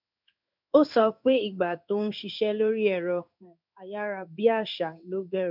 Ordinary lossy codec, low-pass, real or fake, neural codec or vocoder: none; 5.4 kHz; fake; codec, 16 kHz in and 24 kHz out, 1 kbps, XY-Tokenizer